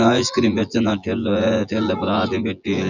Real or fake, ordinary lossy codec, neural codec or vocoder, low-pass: fake; none; vocoder, 24 kHz, 100 mel bands, Vocos; 7.2 kHz